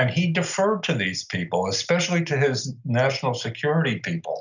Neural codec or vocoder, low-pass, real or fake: none; 7.2 kHz; real